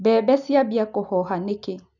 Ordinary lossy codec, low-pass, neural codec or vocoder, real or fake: none; 7.2 kHz; none; real